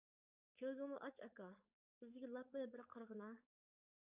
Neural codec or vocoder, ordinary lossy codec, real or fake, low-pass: codec, 16 kHz, 4.8 kbps, FACodec; Opus, 64 kbps; fake; 3.6 kHz